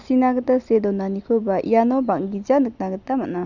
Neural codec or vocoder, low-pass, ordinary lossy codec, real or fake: none; 7.2 kHz; none; real